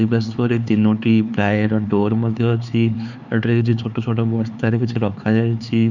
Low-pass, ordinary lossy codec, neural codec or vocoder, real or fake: 7.2 kHz; none; codec, 16 kHz, 4 kbps, X-Codec, HuBERT features, trained on LibriSpeech; fake